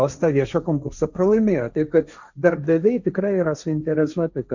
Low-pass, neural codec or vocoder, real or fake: 7.2 kHz; codec, 16 kHz, 1.1 kbps, Voila-Tokenizer; fake